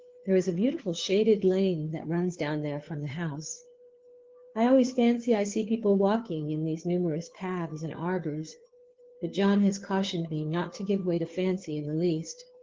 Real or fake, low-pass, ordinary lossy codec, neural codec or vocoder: fake; 7.2 kHz; Opus, 16 kbps; codec, 16 kHz, 2 kbps, FunCodec, trained on Chinese and English, 25 frames a second